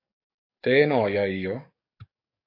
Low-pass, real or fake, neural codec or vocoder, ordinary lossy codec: 5.4 kHz; fake; codec, 44.1 kHz, 7.8 kbps, DAC; MP3, 32 kbps